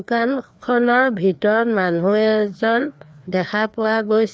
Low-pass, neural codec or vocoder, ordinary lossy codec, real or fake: none; codec, 16 kHz, 2 kbps, FreqCodec, larger model; none; fake